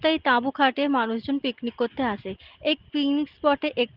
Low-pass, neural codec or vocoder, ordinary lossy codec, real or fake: 5.4 kHz; none; Opus, 16 kbps; real